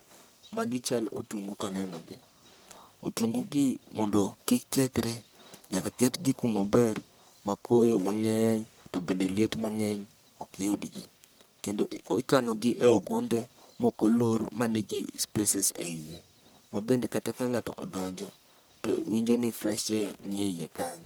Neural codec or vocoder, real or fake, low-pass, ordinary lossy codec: codec, 44.1 kHz, 1.7 kbps, Pupu-Codec; fake; none; none